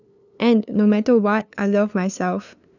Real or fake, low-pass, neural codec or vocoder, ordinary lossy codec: fake; 7.2 kHz; codec, 16 kHz, 2 kbps, FunCodec, trained on LibriTTS, 25 frames a second; none